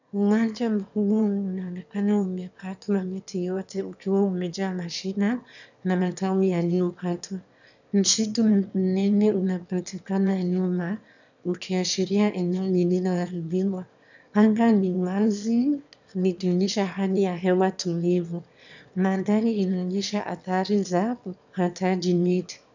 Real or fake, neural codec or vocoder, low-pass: fake; autoencoder, 22.05 kHz, a latent of 192 numbers a frame, VITS, trained on one speaker; 7.2 kHz